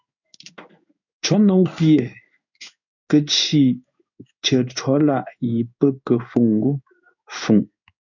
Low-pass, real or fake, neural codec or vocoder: 7.2 kHz; fake; codec, 16 kHz in and 24 kHz out, 1 kbps, XY-Tokenizer